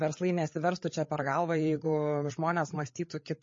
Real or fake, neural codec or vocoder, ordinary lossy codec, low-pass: fake; codec, 16 kHz, 8 kbps, FreqCodec, larger model; MP3, 32 kbps; 7.2 kHz